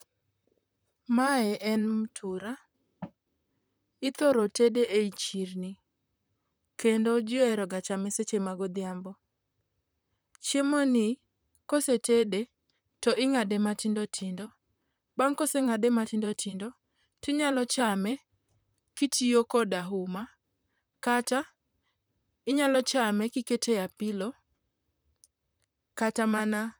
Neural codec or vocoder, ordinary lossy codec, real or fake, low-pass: vocoder, 44.1 kHz, 128 mel bands, Pupu-Vocoder; none; fake; none